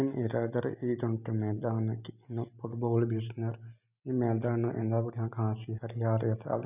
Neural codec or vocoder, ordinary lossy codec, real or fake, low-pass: none; none; real; 3.6 kHz